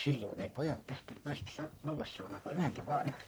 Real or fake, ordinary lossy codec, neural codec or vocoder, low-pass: fake; none; codec, 44.1 kHz, 1.7 kbps, Pupu-Codec; none